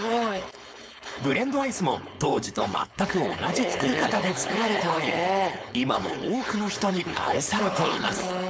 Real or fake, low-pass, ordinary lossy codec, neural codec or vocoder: fake; none; none; codec, 16 kHz, 4.8 kbps, FACodec